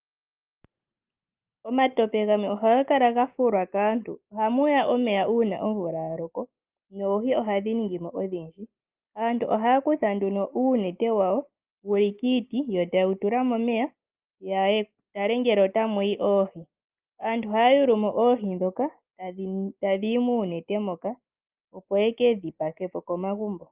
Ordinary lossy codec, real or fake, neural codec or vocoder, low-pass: Opus, 32 kbps; real; none; 3.6 kHz